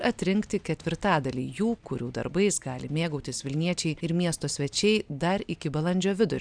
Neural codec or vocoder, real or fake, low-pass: none; real; 9.9 kHz